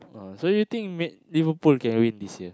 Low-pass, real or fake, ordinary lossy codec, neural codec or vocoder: none; real; none; none